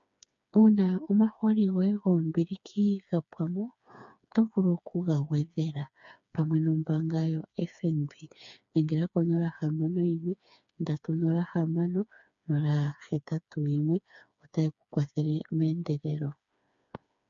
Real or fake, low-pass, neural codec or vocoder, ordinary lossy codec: fake; 7.2 kHz; codec, 16 kHz, 4 kbps, FreqCodec, smaller model; MP3, 64 kbps